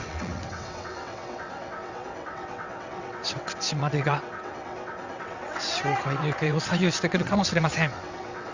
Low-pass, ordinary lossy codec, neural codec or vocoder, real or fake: 7.2 kHz; Opus, 64 kbps; vocoder, 22.05 kHz, 80 mel bands, WaveNeXt; fake